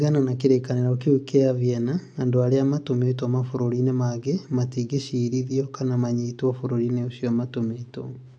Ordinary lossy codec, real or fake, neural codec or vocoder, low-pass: AAC, 64 kbps; real; none; 9.9 kHz